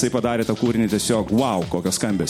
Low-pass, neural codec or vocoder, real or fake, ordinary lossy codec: 19.8 kHz; none; real; MP3, 64 kbps